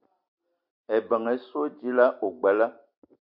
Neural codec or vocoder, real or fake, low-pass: none; real; 5.4 kHz